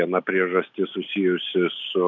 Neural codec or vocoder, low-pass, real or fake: none; 7.2 kHz; real